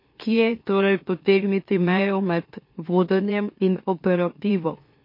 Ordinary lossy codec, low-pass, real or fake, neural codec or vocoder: MP3, 32 kbps; 5.4 kHz; fake; autoencoder, 44.1 kHz, a latent of 192 numbers a frame, MeloTTS